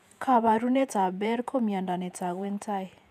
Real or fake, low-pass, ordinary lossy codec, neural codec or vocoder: fake; 14.4 kHz; none; vocoder, 48 kHz, 128 mel bands, Vocos